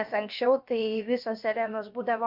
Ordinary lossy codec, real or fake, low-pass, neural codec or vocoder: MP3, 48 kbps; fake; 5.4 kHz; codec, 16 kHz, about 1 kbps, DyCAST, with the encoder's durations